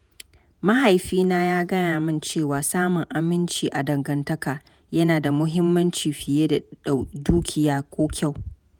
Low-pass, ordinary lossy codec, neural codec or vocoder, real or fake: none; none; vocoder, 48 kHz, 128 mel bands, Vocos; fake